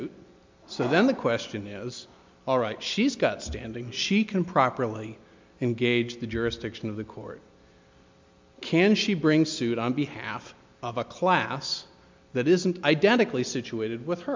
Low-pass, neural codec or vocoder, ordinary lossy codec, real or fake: 7.2 kHz; none; MP3, 64 kbps; real